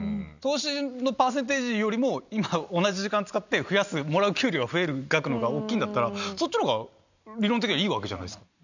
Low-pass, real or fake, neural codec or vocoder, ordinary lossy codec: 7.2 kHz; real; none; none